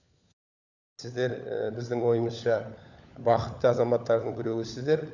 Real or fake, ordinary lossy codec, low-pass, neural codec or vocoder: fake; none; 7.2 kHz; codec, 16 kHz, 16 kbps, FunCodec, trained on LibriTTS, 50 frames a second